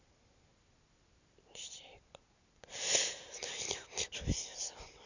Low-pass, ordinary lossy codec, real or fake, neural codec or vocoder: 7.2 kHz; none; real; none